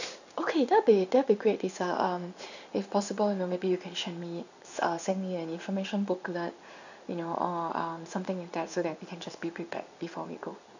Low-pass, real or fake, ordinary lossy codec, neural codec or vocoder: 7.2 kHz; fake; none; codec, 16 kHz in and 24 kHz out, 1 kbps, XY-Tokenizer